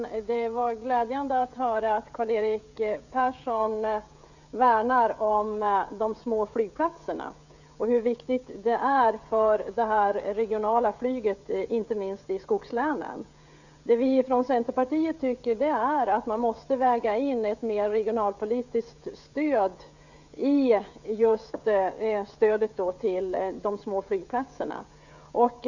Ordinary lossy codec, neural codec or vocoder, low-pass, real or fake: none; codec, 16 kHz, 16 kbps, FreqCodec, smaller model; 7.2 kHz; fake